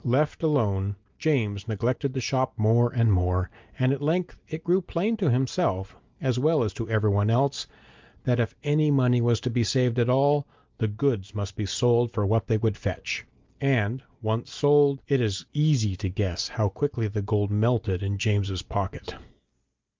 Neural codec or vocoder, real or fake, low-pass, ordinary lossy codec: none; real; 7.2 kHz; Opus, 24 kbps